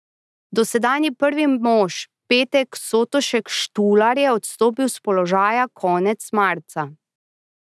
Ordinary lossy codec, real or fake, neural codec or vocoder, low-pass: none; real; none; none